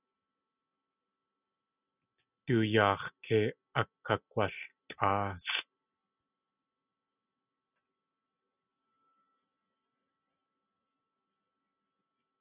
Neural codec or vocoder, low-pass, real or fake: none; 3.6 kHz; real